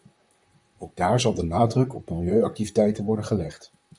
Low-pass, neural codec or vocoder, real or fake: 10.8 kHz; vocoder, 44.1 kHz, 128 mel bands, Pupu-Vocoder; fake